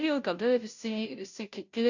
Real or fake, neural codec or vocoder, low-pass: fake; codec, 16 kHz, 0.5 kbps, FunCodec, trained on Chinese and English, 25 frames a second; 7.2 kHz